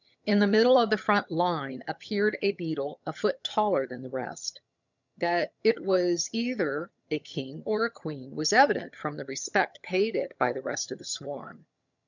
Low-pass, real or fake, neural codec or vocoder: 7.2 kHz; fake; vocoder, 22.05 kHz, 80 mel bands, HiFi-GAN